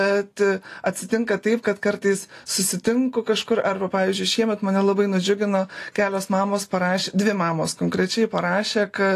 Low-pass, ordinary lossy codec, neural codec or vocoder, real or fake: 14.4 kHz; AAC, 48 kbps; none; real